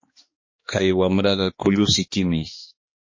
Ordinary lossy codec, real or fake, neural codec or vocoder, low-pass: MP3, 32 kbps; fake; codec, 16 kHz, 2 kbps, X-Codec, HuBERT features, trained on balanced general audio; 7.2 kHz